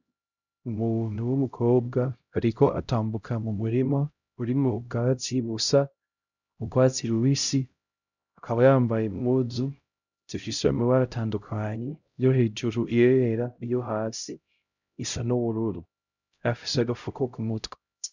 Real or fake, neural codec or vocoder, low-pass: fake; codec, 16 kHz, 0.5 kbps, X-Codec, HuBERT features, trained on LibriSpeech; 7.2 kHz